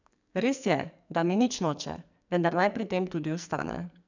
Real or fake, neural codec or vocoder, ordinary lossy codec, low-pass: fake; codec, 44.1 kHz, 2.6 kbps, SNAC; none; 7.2 kHz